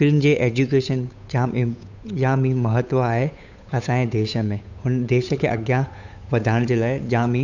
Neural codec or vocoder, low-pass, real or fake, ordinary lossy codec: codec, 16 kHz, 8 kbps, FunCodec, trained on Chinese and English, 25 frames a second; 7.2 kHz; fake; none